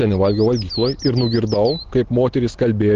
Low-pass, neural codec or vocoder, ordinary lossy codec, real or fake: 7.2 kHz; none; Opus, 16 kbps; real